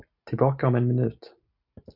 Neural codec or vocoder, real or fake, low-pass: none; real; 5.4 kHz